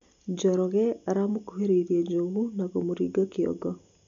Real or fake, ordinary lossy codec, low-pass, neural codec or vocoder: real; none; 7.2 kHz; none